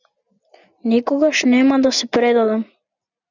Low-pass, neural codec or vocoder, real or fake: 7.2 kHz; none; real